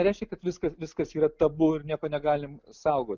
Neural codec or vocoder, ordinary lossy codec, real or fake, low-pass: none; Opus, 24 kbps; real; 7.2 kHz